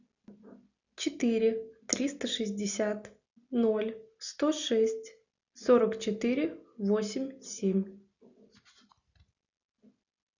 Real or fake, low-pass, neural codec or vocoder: real; 7.2 kHz; none